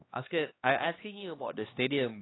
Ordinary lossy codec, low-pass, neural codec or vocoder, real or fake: AAC, 16 kbps; 7.2 kHz; codec, 16 kHz, 2 kbps, X-Codec, HuBERT features, trained on LibriSpeech; fake